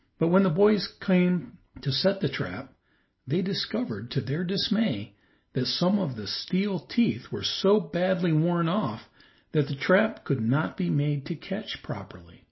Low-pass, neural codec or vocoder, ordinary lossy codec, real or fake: 7.2 kHz; none; MP3, 24 kbps; real